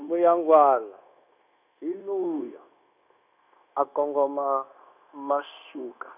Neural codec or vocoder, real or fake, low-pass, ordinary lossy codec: codec, 16 kHz, 0.9 kbps, LongCat-Audio-Codec; fake; 3.6 kHz; none